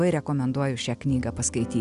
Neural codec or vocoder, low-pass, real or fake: none; 10.8 kHz; real